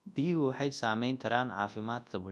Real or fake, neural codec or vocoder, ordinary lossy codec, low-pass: fake; codec, 24 kHz, 0.9 kbps, WavTokenizer, large speech release; none; none